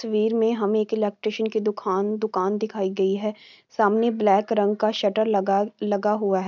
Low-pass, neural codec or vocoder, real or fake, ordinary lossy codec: 7.2 kHz; none; real; none